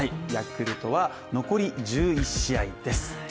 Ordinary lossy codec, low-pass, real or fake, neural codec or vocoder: none; none; real; none